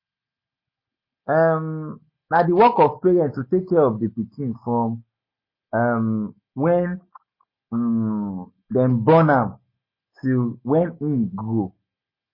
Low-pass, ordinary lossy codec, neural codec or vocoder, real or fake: 5.4 kHz; MP3, 24 kbps; none; real